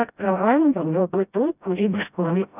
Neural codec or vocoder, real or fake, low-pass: codec, 16 kHz, 0.5 kbps, FreqCodec, smaller model; fake; 3.6 kHz